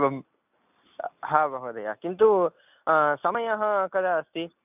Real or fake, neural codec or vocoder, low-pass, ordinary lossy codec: real; none; 3.6 kHz; none